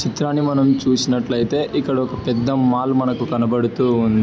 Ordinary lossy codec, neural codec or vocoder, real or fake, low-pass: none; none; real; none